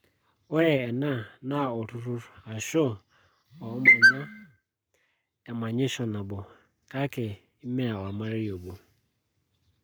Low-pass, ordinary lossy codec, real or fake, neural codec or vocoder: none; none; fake; codec, 44.1 kHz, 7.8 kbps, Pupu-Codec